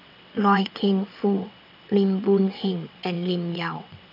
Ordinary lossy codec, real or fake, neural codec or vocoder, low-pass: none; fake; vocoder, 22.05 kHz, 80 mel bands, WaveNeXt; 5.4 kHz